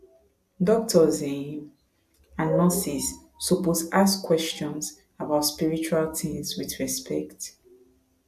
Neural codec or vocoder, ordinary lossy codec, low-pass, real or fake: none; AAC, 96 kbps; 14.4 kHz; real